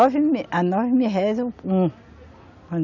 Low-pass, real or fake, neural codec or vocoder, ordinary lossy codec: 7.2 kHz; real; none; none